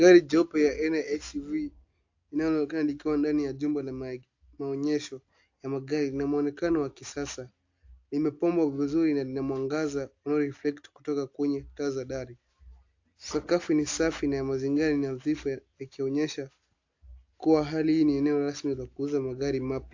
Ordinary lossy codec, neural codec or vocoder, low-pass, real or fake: AAC, 48 kbps; none; 7.2 kHz; real